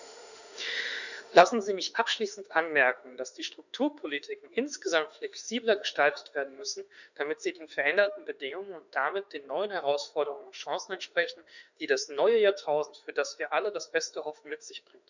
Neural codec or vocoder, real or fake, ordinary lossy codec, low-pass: autoencoder, 48 kHz, 32 numbers a frame, DAC-VAE, trained on Japanese speech; fake; none; 7.2 kHz